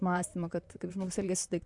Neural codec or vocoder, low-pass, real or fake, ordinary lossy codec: vocoder, 44.1 kHz, 128 mel bands, Pupu-Vocoder; 10.8 kHz; fake; MP3, 64 kbps